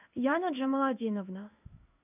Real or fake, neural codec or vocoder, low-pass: fake; codec, 16 kHz in and 24 kHz out, 1 kbps, XY-Tokenizer; 3.6 kHz